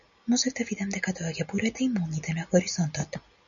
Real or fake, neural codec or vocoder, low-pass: real; none; 7.2 kHz